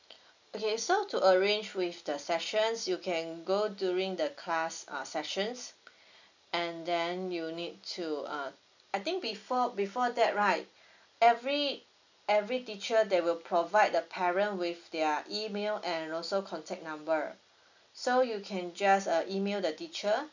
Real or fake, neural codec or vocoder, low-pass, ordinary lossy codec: real; none; 7.2 kHz; none